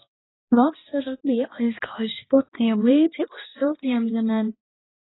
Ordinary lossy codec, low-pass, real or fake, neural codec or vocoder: AAC, 16 kbps; 7.2 kHz; fake; codec, 16 kHz, 2 kbps, X-Codec, HuBERT features, trained on balanced general audio